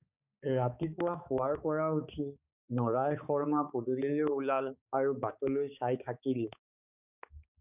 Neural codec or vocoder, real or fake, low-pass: codec, 16 kHz, 4 kbps, X-Codec, HuBERT features, trained on balanced general audio; fake; 3.6 kHz